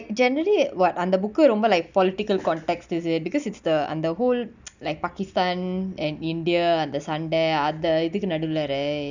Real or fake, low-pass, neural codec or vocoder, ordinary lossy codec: real; 7.2 kHz; none; none